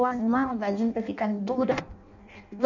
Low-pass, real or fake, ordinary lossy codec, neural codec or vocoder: 7.2 kHz; fake; none; codec, 16 kHz in and 24 kHz out, 0.6 kbps, FireRedTTS-2 codec